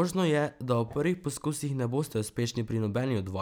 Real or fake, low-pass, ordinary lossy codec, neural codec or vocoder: real; none; none; none